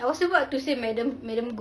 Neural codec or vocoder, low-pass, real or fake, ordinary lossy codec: none; none; real; none